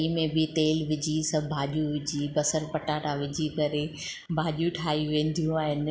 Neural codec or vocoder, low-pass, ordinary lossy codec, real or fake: none; none; none; real